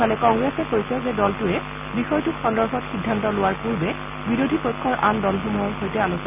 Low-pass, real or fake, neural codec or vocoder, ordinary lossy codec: 3.6 kHz; real; none; none